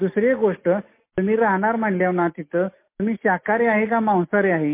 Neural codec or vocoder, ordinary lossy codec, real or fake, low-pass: none; MP3, 24 kbps; real; 3.6 kHz